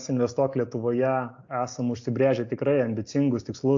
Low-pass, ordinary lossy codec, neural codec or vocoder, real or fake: 7.2 kHz; AAC, 48 kbps; none; real